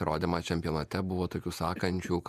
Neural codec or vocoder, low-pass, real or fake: vocoder, 48 kHz, 128 mel bands, Vocos; 14.4 kHz; fake